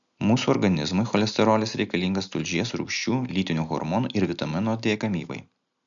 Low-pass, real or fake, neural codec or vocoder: 7.2 kHz; real; none